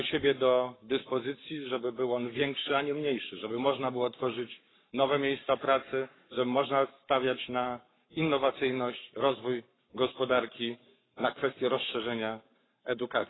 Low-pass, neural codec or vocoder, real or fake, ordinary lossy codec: 7.2 kHz; codec, 44.1 kHz, 7.8 kbps, Pupu-Codec; fake; AAC, 16 kbps